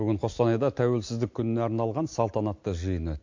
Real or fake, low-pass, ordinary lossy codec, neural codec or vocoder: real; 7.2 kHz; MP3, 48 kbps; none